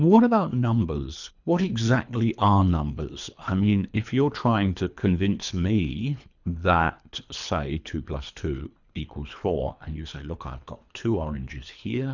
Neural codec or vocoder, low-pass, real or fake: codec, 24 kHz, 3 kbps, HILCodec; 7.2 kHz; fake